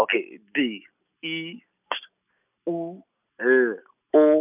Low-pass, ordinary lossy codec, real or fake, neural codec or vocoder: 3.6 kHz; none; real; none